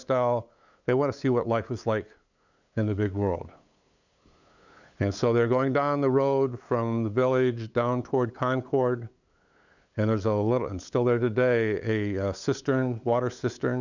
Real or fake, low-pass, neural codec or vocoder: fake; 7.2 kHz; codec, 16 kHz, 8 kbps, FunCodec, trained on LibriTTS, 25 frames a second